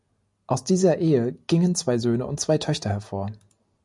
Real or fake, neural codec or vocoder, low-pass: real; none; 10.8 kHz